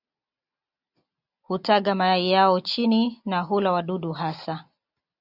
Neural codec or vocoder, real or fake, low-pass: none; real; 5.4 kHz